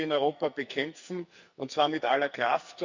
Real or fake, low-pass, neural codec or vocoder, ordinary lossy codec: fake; 7.2 kHz; codec, 44.1 kHz, 2.6 kbps, SNAC; Opus, 64 kbps